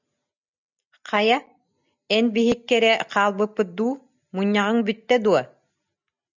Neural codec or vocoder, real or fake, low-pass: none; real; 7.2 kHz